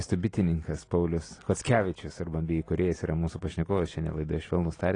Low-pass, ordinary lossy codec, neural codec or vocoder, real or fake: 9.9 kHz; AAC, 32 kbps; none; real